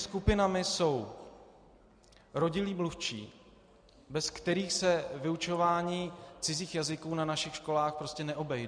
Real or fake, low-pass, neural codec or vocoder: real; 9.9 kHz; none